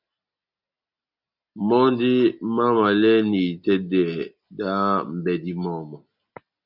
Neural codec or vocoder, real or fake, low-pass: none; real; 5.4 kHz